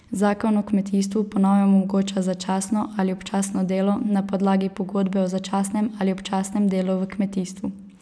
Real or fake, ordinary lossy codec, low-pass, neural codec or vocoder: real; none; none; none